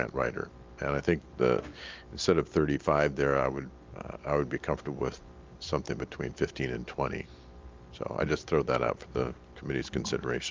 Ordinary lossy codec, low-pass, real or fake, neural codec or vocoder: Opus, 32 kbps; 7.2 kHz; real; none